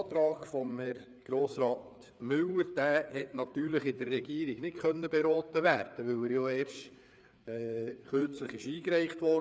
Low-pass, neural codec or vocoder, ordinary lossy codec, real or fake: none; codec, 16 kHz, 4 kbps, FreqCodec, larger model; none; fake